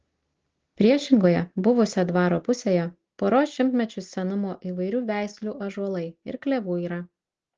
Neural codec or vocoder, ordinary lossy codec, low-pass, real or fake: none; Opus, 16 kbps; 7.2 kHz; real